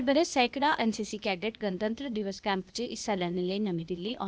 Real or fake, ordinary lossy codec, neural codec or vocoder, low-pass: fake; none; codec, 16 kHz, 0.8 kbps, ZipCodec; none